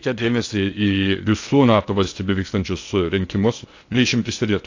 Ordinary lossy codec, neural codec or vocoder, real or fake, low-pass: AAC, 48 kbps; codec, 16 kHz in and 24 kHz out, 0.6 kbps, FocalCodec, streaming, 2048 codes; fake; 7.2 kHz